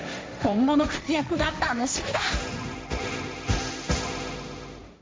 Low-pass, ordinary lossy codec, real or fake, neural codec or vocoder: none; none; fake; codec, 16 kHz, 1.1 kbps, Voila-Tokenizer